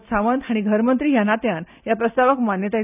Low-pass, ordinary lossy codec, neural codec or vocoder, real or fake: 3.6 kHz; none; none; real